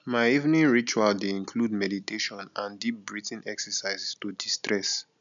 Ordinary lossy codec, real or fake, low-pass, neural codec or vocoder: none; real; 7.2 kHz; none